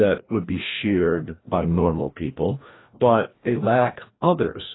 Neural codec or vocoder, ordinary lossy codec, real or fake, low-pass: codec, 16 kHz, 1 kbps, FreqCodec, larger model; AAC, 16 kbps; fake; 7.2 kHz